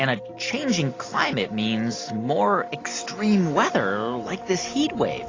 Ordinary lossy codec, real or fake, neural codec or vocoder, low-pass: AAC, 32 kbps; real; none; 7.2 kHz